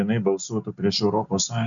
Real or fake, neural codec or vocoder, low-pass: real; none; 7.2 kHz